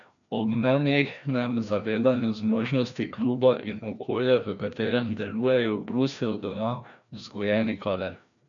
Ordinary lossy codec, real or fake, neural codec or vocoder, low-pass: none; fake; codec, 16 kHz, 1 kbps, FreqCodec, larger model; 7.2 kHz